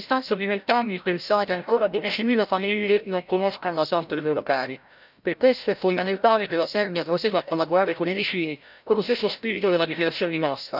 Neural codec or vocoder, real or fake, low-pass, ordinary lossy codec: codec, 16 kHz, 0.5 kbps, FreqCodec, larger model; fake; 5.4 kHz; none